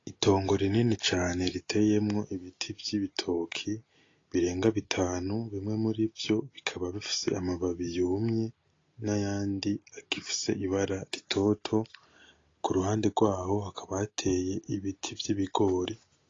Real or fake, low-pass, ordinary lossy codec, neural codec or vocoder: real; 7.2 kHz; AAC, 32 kbps; none